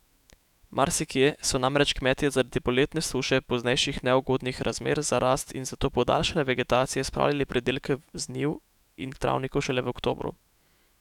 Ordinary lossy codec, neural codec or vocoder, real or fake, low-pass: none; autoencoder, 48 kHz, 128 numbers a frame, DAC-VAE, trained on Japanese speech; fake; 19.8 kHz